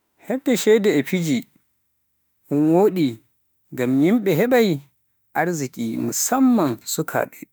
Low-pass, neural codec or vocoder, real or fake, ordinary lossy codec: none; autoencoder, 48 kHz, 32 numbers a frame, DAC-VAE, trained on Japanese speech; fake; none